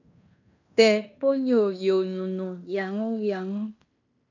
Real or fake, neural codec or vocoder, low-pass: fake; codec, 16 kHz in and 24 kHz out, 0.9 kbps, LongCat-Audio-Codec, fine tuned four codebook decoder; 7.2 kHz